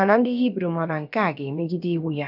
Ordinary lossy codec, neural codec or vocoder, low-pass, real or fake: none; codec, 16 kHz, about 1 kbps, DyCAST, with the encoder's durations; 5.4 kHz; fake